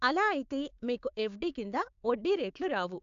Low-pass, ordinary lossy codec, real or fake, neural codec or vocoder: 7.2 kHz; AAC, 96 kbps; fake; codec, 16 kHz, 6 kbps, DAC